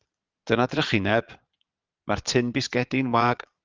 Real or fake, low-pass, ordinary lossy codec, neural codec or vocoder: fake; 7.2 kHz; Opus, 32 kbps; vocoder, 22.05 kHz, 80 mel bands, Vocos